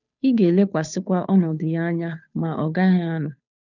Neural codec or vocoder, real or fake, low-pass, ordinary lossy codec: codec, 16 kHz, 2 kbps, FunCodec, trained on Chinese and English, 25 frames a second; fake; 7.2 kHz; none